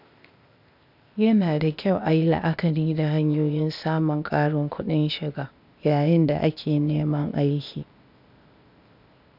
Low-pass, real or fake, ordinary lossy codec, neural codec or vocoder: 5.4 kHz; fake; none; codec, 16 kHz, 0.8 kbps, ZipCodec